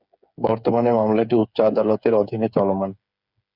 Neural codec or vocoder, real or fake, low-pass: codec, 16 kHz, 8 kbps, FreqCodec, smaller model; fake; 5.4 kHz